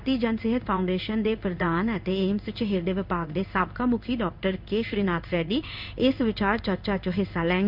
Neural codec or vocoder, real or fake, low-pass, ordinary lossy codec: codec, 16 kHz in and 24 kHz out, 1 kbps, XY-Tokenizer; fake; 5.4 kHz; none